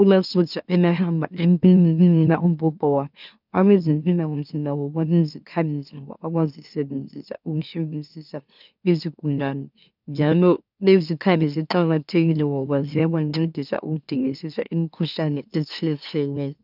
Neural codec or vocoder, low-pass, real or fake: autoencoder, 44.1 kHz, a latent of 192 numbers a frame, MeloTTS; 5.4 kHz; fake